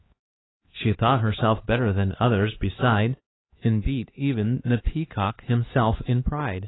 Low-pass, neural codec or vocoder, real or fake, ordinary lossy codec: 7.2 kHz; codec, 16 kHz, 4 kbps, X-Codec, HuBERT features, trained on LibriSpeech; fake; AAC, 16 kbps